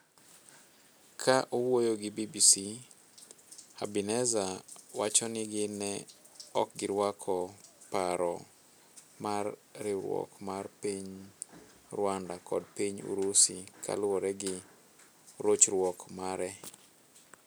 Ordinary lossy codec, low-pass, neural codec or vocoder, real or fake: none; none; none; real